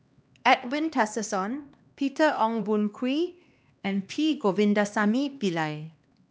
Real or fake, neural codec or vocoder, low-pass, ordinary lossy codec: fake; codec, 16 kHz, 2 kbps, X-Codec, HuBERT features, trained on LibriSpeech; none; none